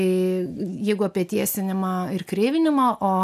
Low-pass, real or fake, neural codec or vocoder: 14.4 kHz; real; none